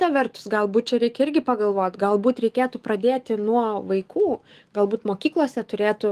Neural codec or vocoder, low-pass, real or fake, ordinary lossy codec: autoencoder, 48 kHz, 128 numbers a frame, DAC-VAE, trained on Japanese speech; 14.4 kHz; fake; Opus, 24 kbps